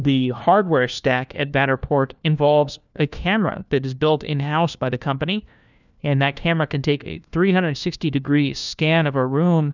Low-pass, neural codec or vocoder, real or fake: 7.2 kHz; codec, 16 kHz, 1 kbps, FunCodec, trained on LibriTTS, 50 frames a second; fake